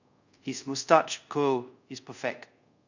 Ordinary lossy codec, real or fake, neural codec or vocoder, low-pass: none; fake; codec, 24 kHz, 0.5 kbps, DualCodec; 7.2 kHz